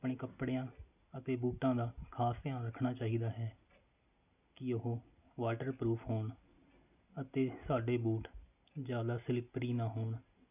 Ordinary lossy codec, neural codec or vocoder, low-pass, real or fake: none; none; 3.6 kHz; real